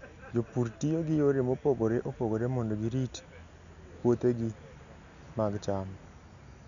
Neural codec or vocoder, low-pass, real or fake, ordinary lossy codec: none; 7.2 kHz; real; none